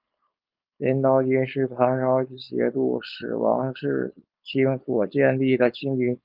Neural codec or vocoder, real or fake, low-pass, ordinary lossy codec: codec, 16 kHz, 4.8 kbps, FACodec; fake; 5.4 kHz; Opus, 24 kbps